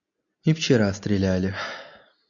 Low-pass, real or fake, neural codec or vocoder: 7.2 kHz; real; none